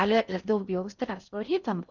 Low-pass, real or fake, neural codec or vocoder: 7.2 kHz; fake; codec, 16 kHz in and 24 kHz out, 0.6 kbps, FocalCodec, streaming, 4096 codes